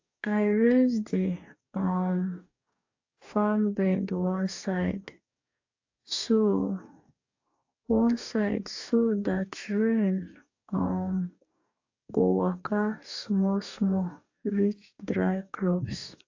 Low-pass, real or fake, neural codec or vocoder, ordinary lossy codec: 7.2 kHz; fake; codec, 44.1 kHz, 2.6 kbps, DAC; AAC, 48 kbps